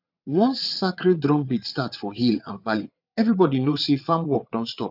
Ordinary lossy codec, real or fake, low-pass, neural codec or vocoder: none; fake; 5.4 kHz; vocoder, 22.05 kHz, 80 mel bands, WaveNeXt